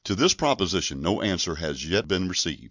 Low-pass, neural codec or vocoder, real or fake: 7.2 kHz; none; real